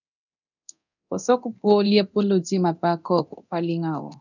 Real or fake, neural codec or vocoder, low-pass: fake; codec, 24 kHz, 0.9 kbps, DualCodec; 7.2 kHz